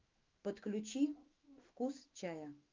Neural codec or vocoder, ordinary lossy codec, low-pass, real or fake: autoencoder, 48 kHz, 128 numbers a frame, DAC-VAE, trained on Japanese speech; Opus, 24 kbps; 7.2 kHz; fake